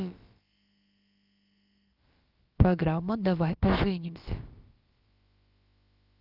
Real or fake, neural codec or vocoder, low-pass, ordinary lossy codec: fake; codec, 16 kHz, about 1 kbps, DyCAST, with the encoder's durations; 5.4 kHz; Opus, 32 kbps